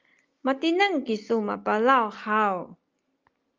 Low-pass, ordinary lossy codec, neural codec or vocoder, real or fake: 7.2 kHz; Opus, 32 kbps; none; real